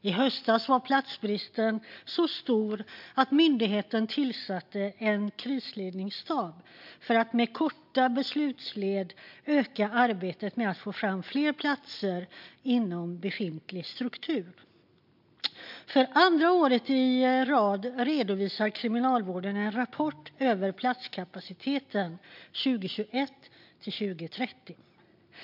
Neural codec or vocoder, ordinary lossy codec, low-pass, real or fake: none; MP3, 48 kbps; 5.4 kHz; real